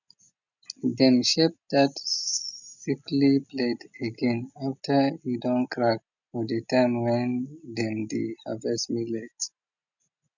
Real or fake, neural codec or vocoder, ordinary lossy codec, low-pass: real; none; none; 7.2 kHz